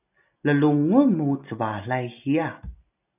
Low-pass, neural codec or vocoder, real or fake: 3.6 kHz; none; real